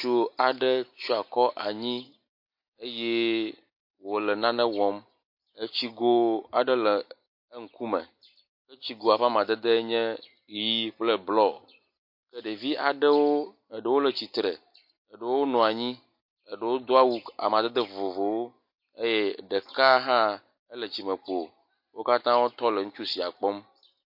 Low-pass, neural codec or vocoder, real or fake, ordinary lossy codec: 5.4 kHz; none; real; MP3, 32 kbps